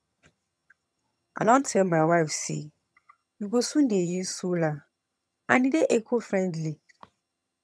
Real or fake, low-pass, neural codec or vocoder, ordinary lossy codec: fake; none; vocoder, 22.05 kHz, 80 mel bands, HiFi-GAN; none